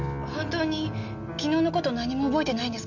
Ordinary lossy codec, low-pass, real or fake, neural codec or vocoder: none; 7.2 kHz; real; none